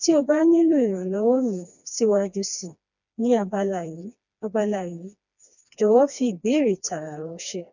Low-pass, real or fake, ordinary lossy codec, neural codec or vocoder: 7.2 kHz; fake; none; codec, 16 kHz, 2 kbps, FreqCodec, smaller model